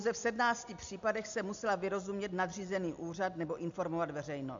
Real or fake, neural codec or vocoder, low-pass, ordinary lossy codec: real; none; 7.2 kHz; MP3, 64 kbps